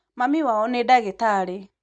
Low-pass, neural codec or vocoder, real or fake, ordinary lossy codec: 9.9 kHz; none; real; none